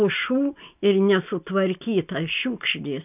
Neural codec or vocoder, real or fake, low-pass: none; real; 3.6 kHz